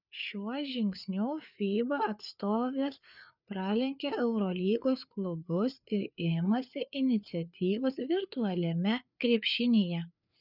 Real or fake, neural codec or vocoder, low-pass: fake; codec, 16 kHz, 4 kbps, FreqCodec, larger model; 5.4 kHz